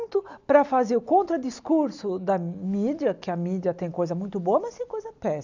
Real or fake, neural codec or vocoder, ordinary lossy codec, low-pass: real; none; none; 7.2 kHz